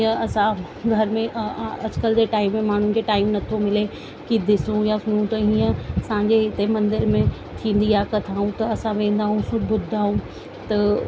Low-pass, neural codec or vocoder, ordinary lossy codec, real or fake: none; none; none; real